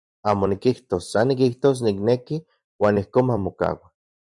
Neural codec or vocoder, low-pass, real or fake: vocoder, 44.1 kHz, 128 mel bands every 256 samples, BigVGAN v2; 10.8 kHz; fake